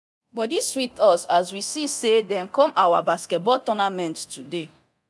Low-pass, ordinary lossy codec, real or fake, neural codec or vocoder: none; none; fake; codec, 24 kHz, 0.9 kbps, DualCodec